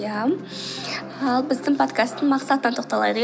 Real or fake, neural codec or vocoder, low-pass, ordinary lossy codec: real; none; none; none